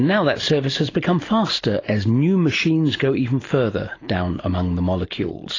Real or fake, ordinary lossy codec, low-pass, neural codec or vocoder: real; AAC, 32 kbps; 7.2 kHz; none